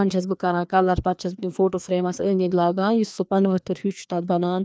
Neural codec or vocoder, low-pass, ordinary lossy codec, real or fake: codec, 16 kHz, 2 kbps, FreqCodec, larger model; none; none; fake